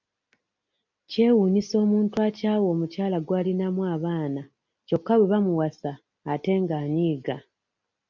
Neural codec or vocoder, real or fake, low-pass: none; real; 7.2 kHz